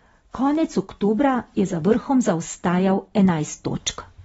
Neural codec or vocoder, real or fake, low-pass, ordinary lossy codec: none; real; 19.8 kHz; AAC, 24 kbps